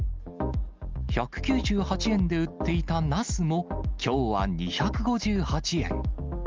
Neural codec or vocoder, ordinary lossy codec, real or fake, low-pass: none; Opus, 32 kbps; real; 7.2 kHz